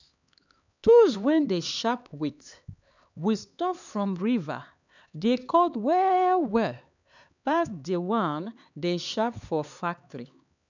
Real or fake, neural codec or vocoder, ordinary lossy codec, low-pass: fake; codec, 16 kHz, 4 kbps, X-Codec, HuBERT features, trained on LibriSpeech; none; 7.2 kHz